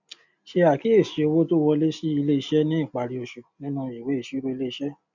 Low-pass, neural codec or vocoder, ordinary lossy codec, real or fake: 7.2 kHz; none; none; real